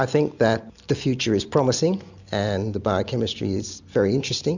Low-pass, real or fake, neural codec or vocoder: 7.2 kHz; real; none